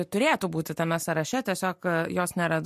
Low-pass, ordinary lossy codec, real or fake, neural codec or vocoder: 14.4 kHz; MP3, 64 kbps; real; none